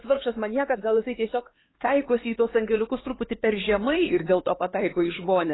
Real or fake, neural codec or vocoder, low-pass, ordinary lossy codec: fake; codec, 16 kHz, 4 kbps, X-Codec, HuBERT features, trained on LibriSpeech; 7.2 kHz; AAC, 16 kbps